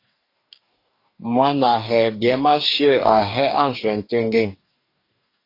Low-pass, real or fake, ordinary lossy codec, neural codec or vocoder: 5.4 kHz; fake; AAC, 32 kbps; codec, 44.1 kHz, 2.6 kbps, DAC